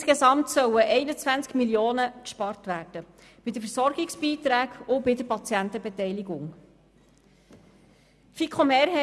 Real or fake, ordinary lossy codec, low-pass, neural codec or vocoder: real; none; none; none